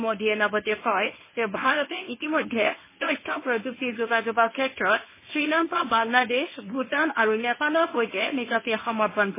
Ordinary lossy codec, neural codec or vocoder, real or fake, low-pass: MP3, 16 kbps; codec, 24 kHz, 0.9 kbps, WavTokenizer, medium speech release version 1; fake; 3.6 kHz